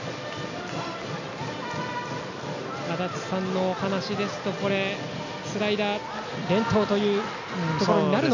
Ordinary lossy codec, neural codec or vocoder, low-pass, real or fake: none; none; 7.2 kHz; real